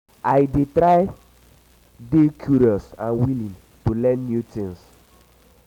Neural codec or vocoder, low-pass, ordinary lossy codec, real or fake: none; 19.8 kHz; none; real